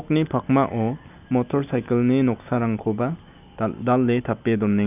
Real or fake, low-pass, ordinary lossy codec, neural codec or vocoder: real; 3.6 kHz; none; none